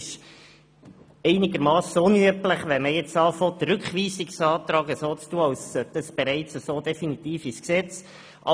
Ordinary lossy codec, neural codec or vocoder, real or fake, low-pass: none; none; real; none